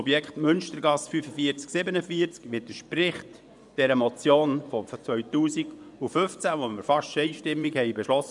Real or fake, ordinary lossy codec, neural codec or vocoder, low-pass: fake; none; vocoder, 24 kHz, 100 mel bands, Vocos; 10.8 kHz